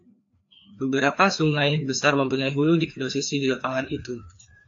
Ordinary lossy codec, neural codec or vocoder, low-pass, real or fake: AAC, 48 kbps; codec, 16 kHz, 2 kbps, FreqCodec, larger model; 7.2 kHz; fake